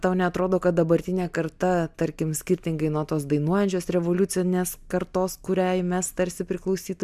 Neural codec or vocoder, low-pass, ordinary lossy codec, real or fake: none; 14.4 kHz; MP3, 96 kbps; real